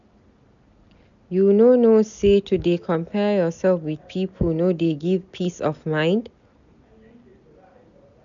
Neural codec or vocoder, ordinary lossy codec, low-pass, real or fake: none; none; 7.2 kHz; real